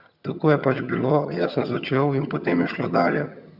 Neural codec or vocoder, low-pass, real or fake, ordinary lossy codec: vocoder, 22.05 kHz, 80 mel bands, HiFi-GAN; 5.4 kHz; fake; Opus, 64 kbps